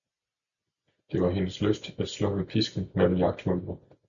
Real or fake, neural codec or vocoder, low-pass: real; none; 7.2 kHz